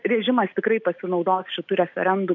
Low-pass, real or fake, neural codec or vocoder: 7.2 kHz; real; none